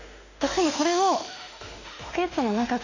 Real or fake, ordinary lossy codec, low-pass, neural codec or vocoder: fake; none; 7.2 kHz; autoencoder, 48 kHz, 32 numbers a frame, DAC-VAE, trained on Japanese speech